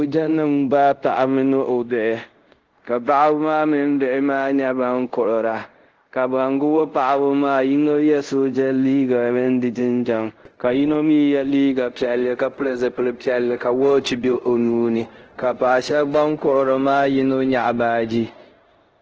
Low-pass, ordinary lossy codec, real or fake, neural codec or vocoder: 7.2 kHz; Opus, 16 kbps; fake; codec, 24 kHz, 0.5 kbps, DualCodec